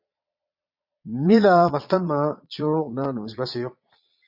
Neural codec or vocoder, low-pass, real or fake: vocoder, 22.05 kHz, 80 mel bands, Vocos; 5.4 kHz; fake